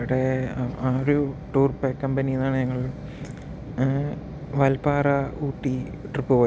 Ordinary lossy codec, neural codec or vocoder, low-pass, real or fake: none; none; none; real